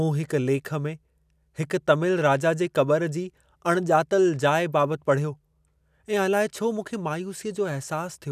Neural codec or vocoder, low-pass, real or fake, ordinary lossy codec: none; 14.4 kHz; real; none